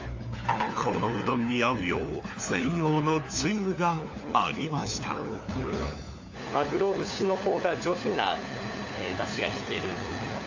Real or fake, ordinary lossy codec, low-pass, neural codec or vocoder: fake; AAC, 48 kbps; 7.2 kHz; codec, 16 kHz, 4 kbps, FunCodec, trained on LibriTTS, 50 frames a second